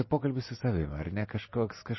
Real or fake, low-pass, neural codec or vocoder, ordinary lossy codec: real; 7.2 kHz; none; MP3, 24 kbps